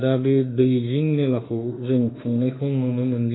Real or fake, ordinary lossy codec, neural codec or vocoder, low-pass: fake; AAC, 16 kbps; autoencoder, 48 kHz, 32 numbers a frame, DAC-VAE, trained on Japanese speech; 7.2 kHz